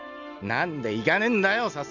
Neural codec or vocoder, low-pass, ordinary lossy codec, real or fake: none; 7.2 kHz; none; real